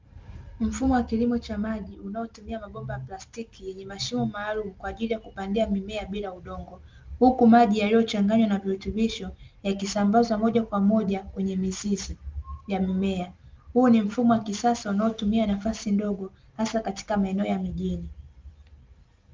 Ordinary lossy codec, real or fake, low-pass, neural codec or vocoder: Opus, 32 kbps; real; 7.2 kHz; none